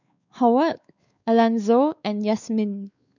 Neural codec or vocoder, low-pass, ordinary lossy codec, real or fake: codec, 16 kHz, 4 kbps, X-Codec, WavLM features, trained on Multilingual LibriSpeech; 7.2 kHz; none; fake